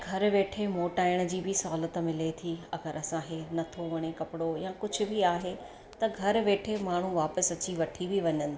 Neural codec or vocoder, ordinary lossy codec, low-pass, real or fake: none; none; none; real